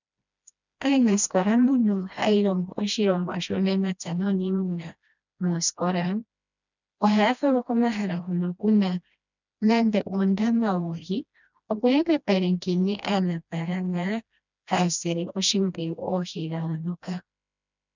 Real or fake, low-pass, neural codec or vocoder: fake; 7.2 kHz; codec, 16 kHz, 1 kbps, FreqCodec, smaller model